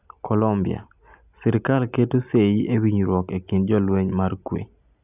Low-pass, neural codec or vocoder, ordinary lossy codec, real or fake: 3.6 kHz; none; none; real